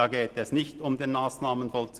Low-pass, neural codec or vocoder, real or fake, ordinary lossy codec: 14.4 kHz; none; real; Opus, 16 kbps